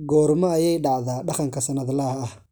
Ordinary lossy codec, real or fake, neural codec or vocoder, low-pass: none; real; none; none